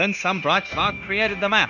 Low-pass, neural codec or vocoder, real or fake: 7.2 kHz; codec, 16 kHz, 0.9 kbps, LongCat-Audio-Codec; fake